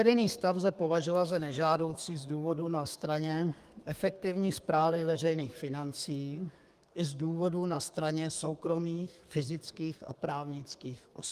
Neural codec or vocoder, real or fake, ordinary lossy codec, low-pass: codec, 32 kHz, 1.9 kbps, SNAC; fake; Opus, 32 kbps; 14.4 kHz